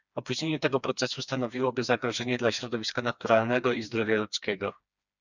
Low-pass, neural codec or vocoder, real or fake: 7.2 kHz; codec, 16 kHz, 2 kbps, FreqCodec, smaller model; fake